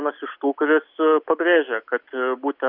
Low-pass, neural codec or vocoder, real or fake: 5.4 kHz; none; real